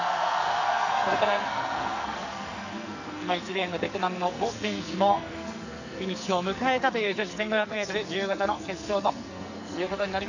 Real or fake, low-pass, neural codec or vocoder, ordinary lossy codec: fake; 7.2 kHz; codec, 44.1 kHz, 2.6 kbps, SNAC; none